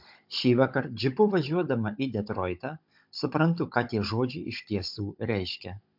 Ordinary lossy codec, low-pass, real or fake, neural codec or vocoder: MP3, 48 kbps; 5.4 kHz; fake; codec, 16 kHz, 16 kbps, FunCodec, trained on Chinese and English, 50 frames a second